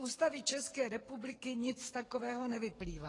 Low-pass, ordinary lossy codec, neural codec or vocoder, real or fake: 10.8 kHz; AAC, 32 kbps; codec, 44.1 kHz, 7.8 kbps, DAC; fake